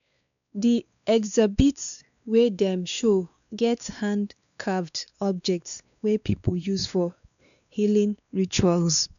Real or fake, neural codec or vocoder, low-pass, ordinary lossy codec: fake; codec, 16 kHz, 2 kbps, X-Codec, WavLM features, trained on Multilingual LibriSpeech; 7.2 kHz; none